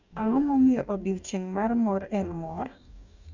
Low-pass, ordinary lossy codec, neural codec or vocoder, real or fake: 7.2 kHz; none; codec, 44.1 kHz, 2.6 kbps, DAC; fake